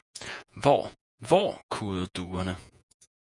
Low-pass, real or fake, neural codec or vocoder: 10.8 kHz; fake; vocoder, 48 kHz, 128 mel bands, Vocos